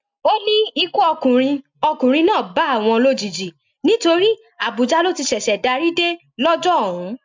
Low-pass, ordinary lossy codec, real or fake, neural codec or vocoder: 7.2 kHz; MP3, 64 kbps; real; none